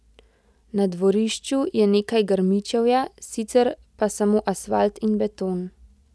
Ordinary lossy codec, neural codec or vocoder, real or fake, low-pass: none; none; real; none